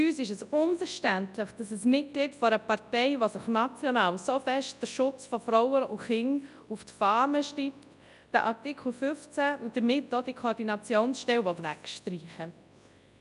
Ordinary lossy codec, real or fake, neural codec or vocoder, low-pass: none; fake; codec, 24 kHz, 0.9 kbps, WavTokenizer, large speech release; 10.8 kHz